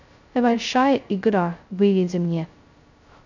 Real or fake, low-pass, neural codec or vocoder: fake; 7.2 kHz; codec, 16 kHz, 0.2 kbps, FocalCodec